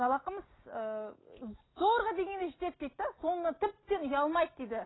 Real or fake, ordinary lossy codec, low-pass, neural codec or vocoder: real; AAC, 16 kbps; 7.2 kHz; none